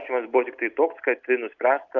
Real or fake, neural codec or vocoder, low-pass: real; none; 7.2 kHz